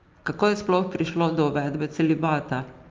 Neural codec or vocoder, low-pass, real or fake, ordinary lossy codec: none; 7.2 kHz; real; Opus, 32 kbps